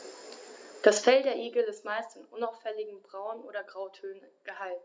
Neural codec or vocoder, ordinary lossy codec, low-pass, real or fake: none; none; none; real